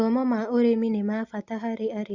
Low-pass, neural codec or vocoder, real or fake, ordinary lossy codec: 7.2 kHz; none; real; Opus, 64 kbps